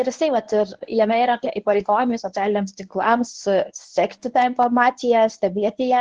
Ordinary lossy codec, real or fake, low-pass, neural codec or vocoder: Opus, 16 kbps; fake; 10.8 kHz; codec, 24 kHz, 0.9 kbps, WavTokenizer, medium speech release version 1